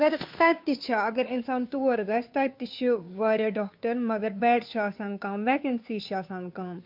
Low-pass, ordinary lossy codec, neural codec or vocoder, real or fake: 5.4 kHz; MP3, 48 kbps; codec, 16 kHz, 2 kbps, FunCodec, trained on Chinese and English, 25 frames a second; fake